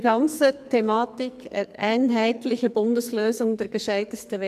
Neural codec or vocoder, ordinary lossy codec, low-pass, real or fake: codec, 44.1 kHz, 2.6 kbps, SNAC; MP3, 96 kbps; 14.4 kHz; fake